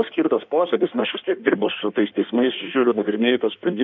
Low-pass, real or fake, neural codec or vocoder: 7.2 kHz; fake; codec, 16 kHz in and 24 kHz out, 1.1 kbps, FireRedTTS-2 codec